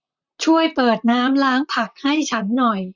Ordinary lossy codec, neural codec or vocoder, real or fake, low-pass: none; vocoder, 44.1 kHz, 128 mel bands, Pupu-Vocoder; fake; 7.2 kHz